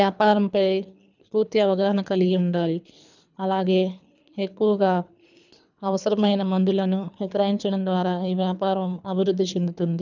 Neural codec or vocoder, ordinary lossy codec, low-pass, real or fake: codec, 24 kHz, 3 kbps, HILCodec; none; 7.2 kHz; fake